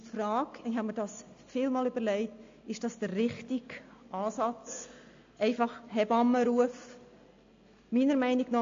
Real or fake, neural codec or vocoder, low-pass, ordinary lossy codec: real; none; 7.2 kHz; AAC, 48 kbps